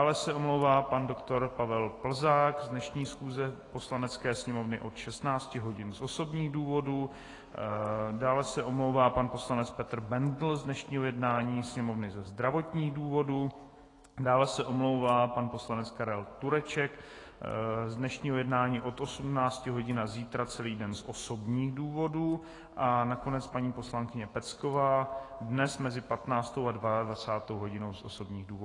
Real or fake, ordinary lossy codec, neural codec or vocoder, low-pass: fake; AAC, 32 kbps; autoencoder, 48 kHz, 128 numbers a frame, DAC-VAE, trained on Japanese speech; 10.8 kHz